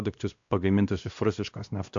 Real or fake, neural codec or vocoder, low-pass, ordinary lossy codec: fake; codec, 16 kHz, 1 kbps, X-Codec, WavLM features, trained on Multilingual LibriSpeech; 7.2 kHz; AAC, 64 kbps